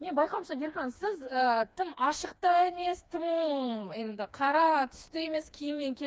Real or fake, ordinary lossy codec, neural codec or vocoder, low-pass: fake; none; codec, 16 kHz, 2 kbps, FreqCodec, smaller model; none